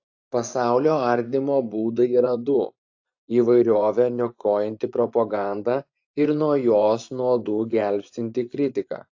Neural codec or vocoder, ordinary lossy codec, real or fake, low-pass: vocoder, 24 kHz, 100 mel bands, Vocos; AAC, 48 kbps; fake; 7.2 kHz